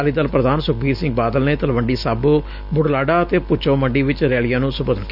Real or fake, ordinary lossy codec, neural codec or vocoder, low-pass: real; none; none; 5.4 kHz